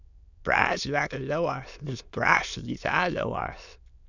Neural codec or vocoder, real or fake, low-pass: autoencoder, 22.05 kHz, a latent of 192 numbers a frame, VITS, trained on many speakers; fake; 7.2 kHz